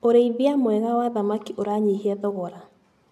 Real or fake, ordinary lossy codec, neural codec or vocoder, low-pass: real; none; none; 14.4 kHz